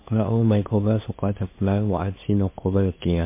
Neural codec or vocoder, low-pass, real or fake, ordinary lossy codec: codec, 16 kHz, 2 kbps, FunCodec, trained on LibriTTS, 25 frames a second; 3.6 kHz; fake; MP3, 24 kbps